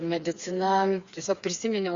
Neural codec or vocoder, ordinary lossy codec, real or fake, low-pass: codec, 16 kHz, 4 kbps, FreqCodec, smaller model; Opus, 64 kbps; fake; 7.2 kHz